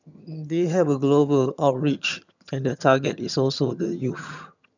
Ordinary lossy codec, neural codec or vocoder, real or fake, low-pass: none; vocoder, 22.05 kHz, 80 mel bands, HiFi-GAN; fake; 7.2 kHz